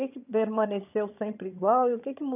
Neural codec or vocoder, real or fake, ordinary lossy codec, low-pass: vocoder, 22.05 kHz, 80 mel bands, HiFi-GAN; fake; none; 3.6 kHz